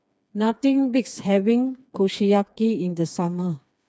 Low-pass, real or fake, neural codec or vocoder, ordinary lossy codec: none; fake; codec, 16 kHz, 4 kbps, FreqCodec, smaller model; none